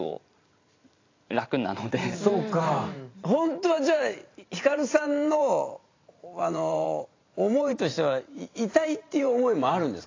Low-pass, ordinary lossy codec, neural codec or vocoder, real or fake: 7.2 kHz; AAC, 32 kbps; vocoder, 44.1 kHz, 128 mel bands every 512 samples, BigVGAN v2; fake